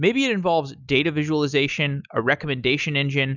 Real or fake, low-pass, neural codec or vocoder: real; 7.2 kHz; none